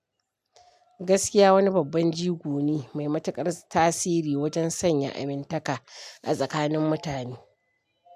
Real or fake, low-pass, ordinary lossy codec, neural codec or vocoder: real; 14.4 kHz; AAC, 96 kbps; none